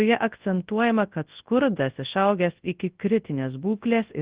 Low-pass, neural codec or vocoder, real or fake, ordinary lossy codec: 3.6 kHz; codec, 24 kHz, 0.9 kbps, WavTokenizer, large speech release; fake; Opus, 16 kbps